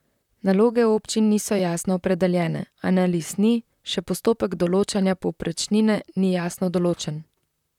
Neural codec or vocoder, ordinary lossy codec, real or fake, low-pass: vocoder, 44.1 kHz, 128 mel bands, Pupu-Vocoder; none; fake; 19.8 kHz